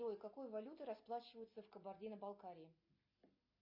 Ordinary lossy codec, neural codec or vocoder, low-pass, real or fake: MP3, 32 kbps; none; 5.4 kHz; real